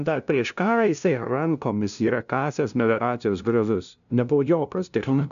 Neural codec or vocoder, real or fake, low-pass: codec, 16 kHz, 0.5 kbps, FunCodec, trained on LibriTTS, 25 frames a second; fake; 7.2 kHz